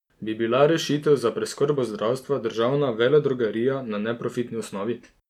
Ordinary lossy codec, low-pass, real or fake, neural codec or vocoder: none; 19.8 kHz; real; none